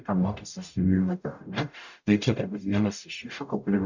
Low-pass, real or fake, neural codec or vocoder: 7.2 kHz; fake; codec, 44.1 kHz, 0.9 kbps, DAC